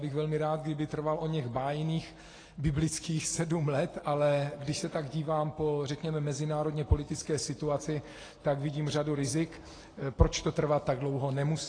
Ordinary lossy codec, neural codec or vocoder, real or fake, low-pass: AAC, 32 kbps; none; real; 9.9 kHz